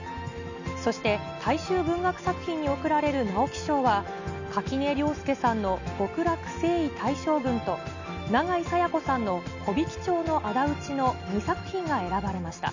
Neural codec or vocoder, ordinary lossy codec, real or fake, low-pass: none; none; real; 7.2 kHz